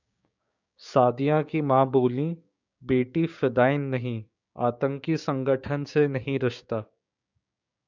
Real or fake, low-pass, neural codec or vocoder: fake; 7.2 kHz; codec, 16 kHz, 6 kbps, DAC